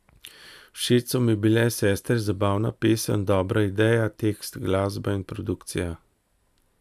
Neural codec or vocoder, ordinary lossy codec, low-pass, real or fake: none; none; 14.4 kHz; real